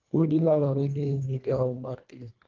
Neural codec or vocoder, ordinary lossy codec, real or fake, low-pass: codec, 24 kHz, 1.5 kbps, HILCodec; Opus, 32 kbps; fake; 7.2 kHz